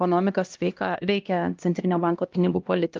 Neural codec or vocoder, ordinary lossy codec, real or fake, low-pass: codec, 16 kHz, 1 kbps, X-Codec, HuBERT features, trained on LibriSpeech; Opus, 32 kbps; fake; 7.2 kHz